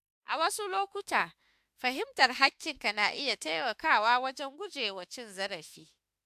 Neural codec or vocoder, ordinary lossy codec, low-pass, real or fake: autoencoder, 48 kHz, 32 numbers a frame, DAC-VAE, trained on Japanese speech; AAC, 96 kbps; 14.4 kHz; fake